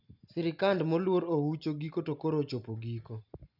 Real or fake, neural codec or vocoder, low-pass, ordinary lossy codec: real; none; 5.4 kHz; none